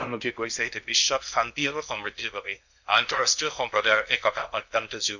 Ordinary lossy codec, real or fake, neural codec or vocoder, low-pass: none; fake; codec, 16 kHz in and 24 kHz out, 0.6 kbps, FocalCodec, streaming, 2048 codes; 7.2 kHz